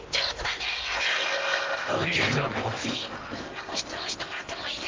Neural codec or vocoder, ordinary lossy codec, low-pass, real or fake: codec, 16 kHz in and 24 kHz out, 0.8 kbps, FocalCodec, streaming, 65536 codes; Opus, 24 kbps; 7.2 kHz; fake